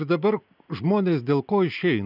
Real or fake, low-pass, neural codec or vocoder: real; 5.4 kHz; none